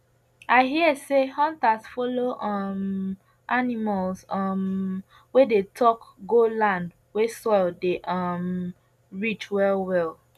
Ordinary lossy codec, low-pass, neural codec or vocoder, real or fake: none; 14.4 kHz; none; real